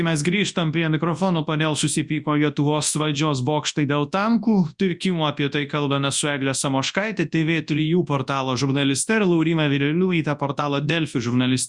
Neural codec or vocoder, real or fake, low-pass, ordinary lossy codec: codec, 24 kHz, 0.9 kbps, WavTokenizer, large speech release; fake; 10.8 kHz; Opus, 64 kbps